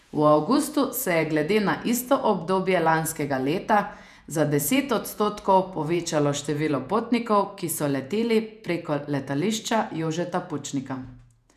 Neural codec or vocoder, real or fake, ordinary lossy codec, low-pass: vocoder, 48 kHz, 128 mel bands, Vocos; fake; none; 14.4 kHz